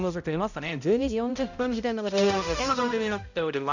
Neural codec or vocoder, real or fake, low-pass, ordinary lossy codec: codec, 16 kHz, 0.5 kbps, X-Codec, HuBERT features, trained on balanced general audio; fake; 7.2 kHz; none